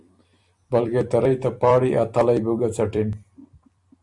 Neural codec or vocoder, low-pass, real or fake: vocoder, 44.1 kHz, 128 mel bands every 256 samples, BigVGAN v2; 10.8 kHz; fake